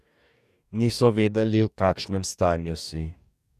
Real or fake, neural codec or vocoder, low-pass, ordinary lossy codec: fake; codec, 44.1 kHz, 2.6 kbps, DAC; 14.4 kHz; none